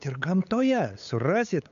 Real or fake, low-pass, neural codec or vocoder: fake; 7.2 kHz; codec, 16 kHz, 8 kbps, FunCodec, trained on LibriTTS, 25 frames a second